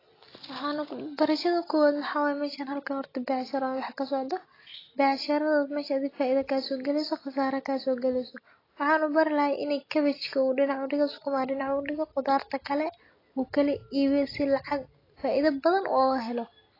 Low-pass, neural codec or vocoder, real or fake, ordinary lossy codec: 5.4 kHz; none; real; AAC, 24 kbps